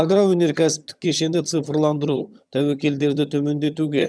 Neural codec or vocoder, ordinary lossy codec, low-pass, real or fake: vocoder, 22.05 kHz, 80 mel bands, HiFi-GAN; none; none; fake